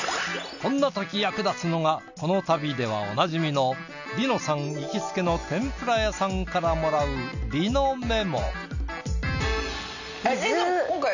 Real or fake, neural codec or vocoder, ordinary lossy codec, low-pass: real; none; none; 7.2 kHz